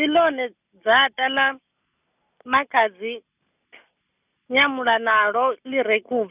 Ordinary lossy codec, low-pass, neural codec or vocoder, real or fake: none; 3.6 kHz; none; real